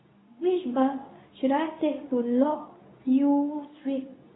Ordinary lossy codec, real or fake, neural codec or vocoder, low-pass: AAC, 16 kbps; fake; codec, 24 kHz, 0.9 kbps, WavTokenizer, medium speech release version 2; 7.2 kHz